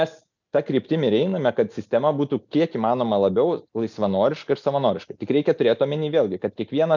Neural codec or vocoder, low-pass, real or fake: none; 7.2 kHz; real